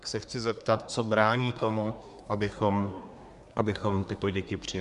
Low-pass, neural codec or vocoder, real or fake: 10.8 kHz; codec, 24 kHz, 1 kbps, SNAC; fake